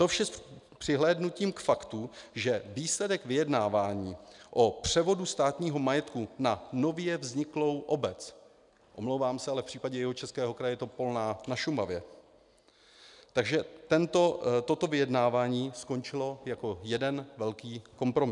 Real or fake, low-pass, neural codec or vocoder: real; 10.8 kHz; none